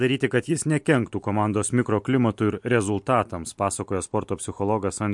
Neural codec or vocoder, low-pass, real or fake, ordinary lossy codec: none; 10.8 kHz; real; MP3, 64 kbps